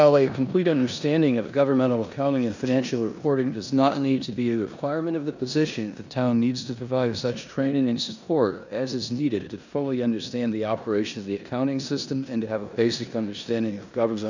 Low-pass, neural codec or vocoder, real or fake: 7.2 kHz; codec, 16 kHz in and 24 kHz out, 0.9 kbps, LongCat-Audio-Codec, four codebook decoder; fake